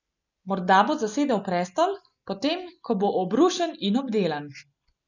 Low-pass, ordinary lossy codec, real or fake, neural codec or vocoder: 7.2 kHz; none; real; none